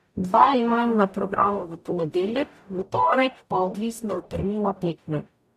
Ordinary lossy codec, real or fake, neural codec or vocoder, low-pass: AAC, 96 kbps; fake; codec, 44.1 kHz, 0.9 kbps, DAC; 14.4 kHz